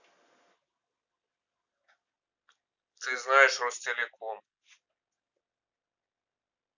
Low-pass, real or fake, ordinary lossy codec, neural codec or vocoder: 7.2 kHz; real; none; none